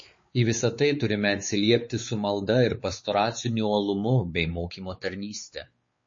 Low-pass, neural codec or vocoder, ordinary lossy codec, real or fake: 7.2 kHz; codec, 16 kHz, 4 kbps, X-Codec, WavLM features, trained on Multilingual LibriSpeech; MP3, 32 kbps; fake